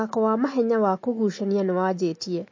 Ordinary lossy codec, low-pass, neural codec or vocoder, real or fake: MP3, 32 kbps; 7.2 kHz; none; real